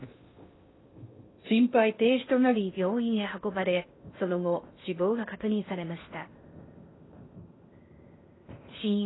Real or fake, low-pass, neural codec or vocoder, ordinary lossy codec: fake; 7.2 kHz; codec, 16 kHz in and 24 kHz out, 0.6 kbps, FocalCodec, streaming, 2048 codes; AAC, 16 kbps